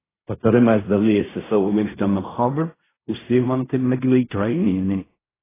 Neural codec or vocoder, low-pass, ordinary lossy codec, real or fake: codec, 16 kHz in and 24 kHz out, 0.4 kbps, LongCat-Audio-Codec, fine tuned four codebook decoder; 3.6 kHz; AAC, 16 kbps; fake